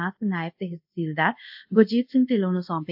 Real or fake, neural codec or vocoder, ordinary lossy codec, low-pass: fake; codec, 24 kHz, 0.5 kbps, DualCodec; AAC, 48 kbps; 5.4 kHz